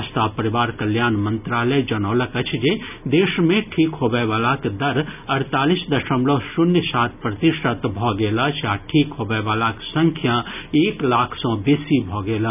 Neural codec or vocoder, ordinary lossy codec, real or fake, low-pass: none; none; real; 3.6 kHz